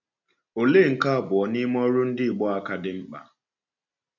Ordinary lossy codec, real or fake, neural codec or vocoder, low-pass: none; real; none; 7.2 kHz